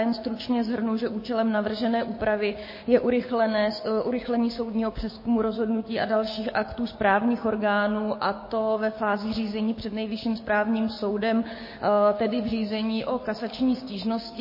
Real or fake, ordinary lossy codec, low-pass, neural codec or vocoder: fake; MP3, 24 kbps; 5.4 kHz; codec, 16 kHz, 6 kbps, DAC